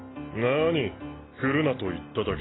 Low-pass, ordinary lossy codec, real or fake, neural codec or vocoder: 7.2 kHz; AAC, 16 kbps; real; none